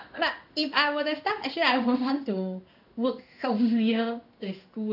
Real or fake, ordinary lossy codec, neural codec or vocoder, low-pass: fake; none; codec, 16 kHz in and 24 kHz out, 1 kbps, XY-Tokenizer; 5.4 kHz